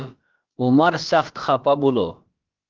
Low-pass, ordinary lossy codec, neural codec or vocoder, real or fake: 7.2 kHz; Opus, 32 kbps; codec, 16 kHz, about 1 kbps, DyCAST, with the encoder's durations; fake